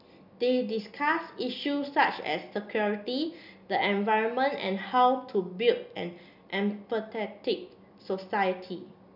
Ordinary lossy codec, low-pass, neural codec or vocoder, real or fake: none; 5.4 kHz; none; real